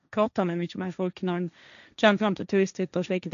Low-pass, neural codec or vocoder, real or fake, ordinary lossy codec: 7.2 kHz; codec, 16 kHz, 1.1 kbps, Voila-Tokenizer; fake; none